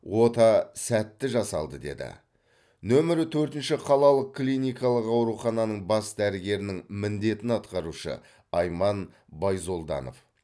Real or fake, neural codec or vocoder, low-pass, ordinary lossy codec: real; none; none; none